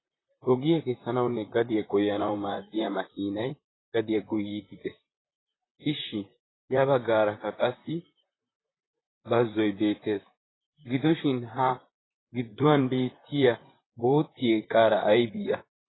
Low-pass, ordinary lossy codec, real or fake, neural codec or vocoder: 7.2 kHz; AAC, 16 kbps; fake; vocoder, 44.1 kHz, 128 mel bands, Pupu-Vocoder